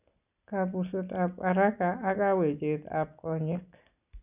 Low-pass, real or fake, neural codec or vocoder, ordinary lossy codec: 3.6 kHz; real; none; none